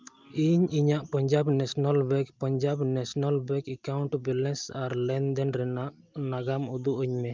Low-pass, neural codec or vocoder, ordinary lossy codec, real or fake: 7.2 kHz; none; Opus, 24 kbps; real